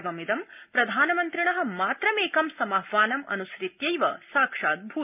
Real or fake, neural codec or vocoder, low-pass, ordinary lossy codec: real; none; 3.6 kHz; none